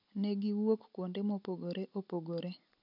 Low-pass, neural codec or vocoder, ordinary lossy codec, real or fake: 5.4 kHz; none; none; real